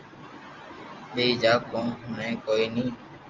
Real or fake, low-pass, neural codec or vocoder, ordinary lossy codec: real; 7.2 kHz; none; Opus, 32 kbps